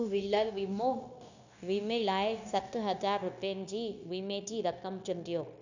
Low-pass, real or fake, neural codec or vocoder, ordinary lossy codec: 7.2 kHz; fake; codec, 16 kHz, 0.9 kbps, LongCat-Audio-Codec; none